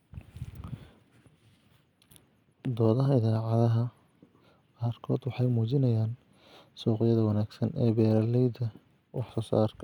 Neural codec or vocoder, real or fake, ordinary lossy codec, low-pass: none; real; none; 19.8 kHz